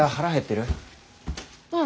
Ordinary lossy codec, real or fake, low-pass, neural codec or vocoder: none; real; none; none